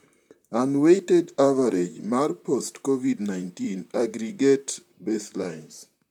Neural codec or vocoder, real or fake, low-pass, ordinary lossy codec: vocoder, 44.1 kHz, 128 mel bands, Pupu-Vocoder; fake; 19.8 kHz; none